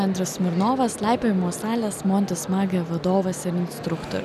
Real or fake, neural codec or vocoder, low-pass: real; none; 14.4 kHz